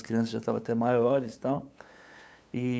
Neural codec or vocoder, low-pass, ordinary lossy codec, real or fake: codec, 16 kHz, 8 kbps, FunCodec, trained on LibriTTS, 25 frames a second; none; none; fake